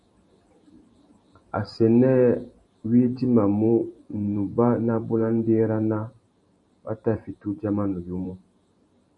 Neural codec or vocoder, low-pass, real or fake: vocoder, 44.1 kHz, 128 mel bands every 512 samples, BigVGAN v2; 10.8 kHz; fake